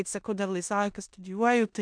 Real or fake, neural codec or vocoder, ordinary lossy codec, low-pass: fake; codec, 16 kHz in and 24 kHz out, 0.9 kbps, LongCat-Audio-Codec, four codebook decoder; MP3, 96 kbps; 9.9 kHz